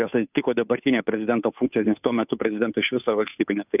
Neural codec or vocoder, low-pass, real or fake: codec, 16 kHz, 2 kbps, FunCodec, trained on Chinese and English, 25 frames a second; 3.6 kHz; fake